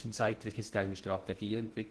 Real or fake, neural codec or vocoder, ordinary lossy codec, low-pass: fake; codec, 16 kHz in and 24 kHz out, 0.8 kbps, FocalCodec, streaming, 65536 codes; Opus, 16 kbps; 10.8 kHz